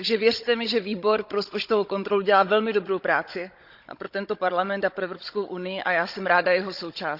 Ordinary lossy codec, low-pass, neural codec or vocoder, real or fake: Opus, 64 kbps; 5.4 kHz; codec, 16 kHz, 16 kbps, FunCodec, trained on Chinese and English, 50 frames a second; fake